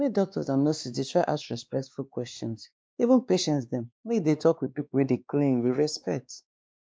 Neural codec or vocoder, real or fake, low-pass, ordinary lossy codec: codec, 16 kHz, 2 kbps, X-Codec, WavLM features, trained on Multilingual LibriSpeech; fake; none; none